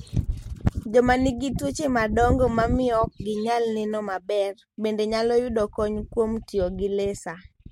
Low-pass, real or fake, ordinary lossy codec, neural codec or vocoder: 19.8 kHz; real; MP3, 64 kbps; none